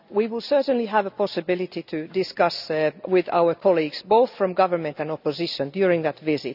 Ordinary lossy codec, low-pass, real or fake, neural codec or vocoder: none; 5.4 kHz; real; none